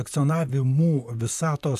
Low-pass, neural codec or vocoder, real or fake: 14.4 kHz; vocoder, 44.1 kHz, 128 mel bands, Pupu-Vocoder; fake